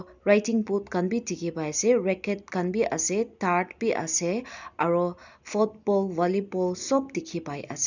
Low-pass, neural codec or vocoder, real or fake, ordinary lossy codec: 7.2 kHz; none; real; none